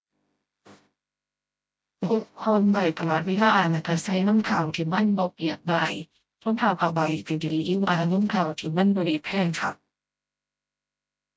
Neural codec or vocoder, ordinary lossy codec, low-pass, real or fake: codec, 16 kHz, 0.5 kbps, FreqCodec, smaller model; none; none; fake